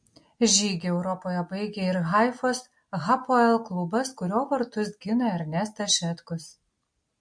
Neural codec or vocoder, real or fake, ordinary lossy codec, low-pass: none; real; MP3, 48 kbps; 9.9 kHz